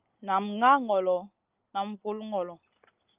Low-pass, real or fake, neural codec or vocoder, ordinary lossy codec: 3.6 kHz; real; none; Opus, 24 kbps